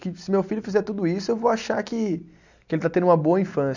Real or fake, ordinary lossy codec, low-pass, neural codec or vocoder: real; none; 7.2 kHz; none